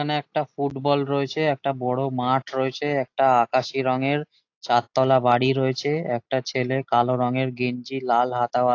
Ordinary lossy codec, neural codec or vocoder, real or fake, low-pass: AAC, 48 kbps; none; real; 7.2 kHz